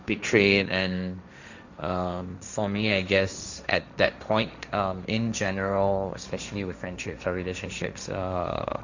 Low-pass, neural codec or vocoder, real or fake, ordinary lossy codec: 7.2 kHz; codec, 16 kHz, 1.1 kbps, Voila-Tokenizer; fake; Opus, 64 kbps